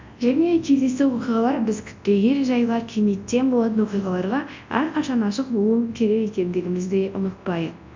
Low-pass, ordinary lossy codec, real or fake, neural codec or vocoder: 7.2 kHz; MP3, 48 kbps; fake; codec, 24 kHz, 0.9 kbps, WavTokenizer, large speech release